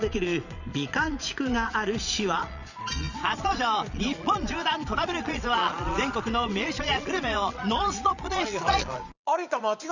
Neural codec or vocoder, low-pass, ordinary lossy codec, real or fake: vocoder, 22.05 kHz, 80 mel bands, Vocos; 7.2 kHz; none; fake